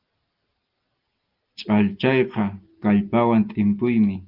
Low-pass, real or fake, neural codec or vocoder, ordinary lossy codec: 5.4 kHz; real; none; Opus, 32 kbps